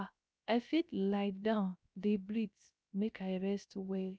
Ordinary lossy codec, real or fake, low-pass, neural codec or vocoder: none; fake; none; codec, 16 kHz, 0.3 kbps, FocalCodec